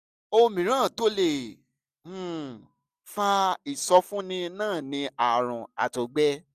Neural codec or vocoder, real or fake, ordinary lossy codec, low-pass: none; real; none; 14.4 kHz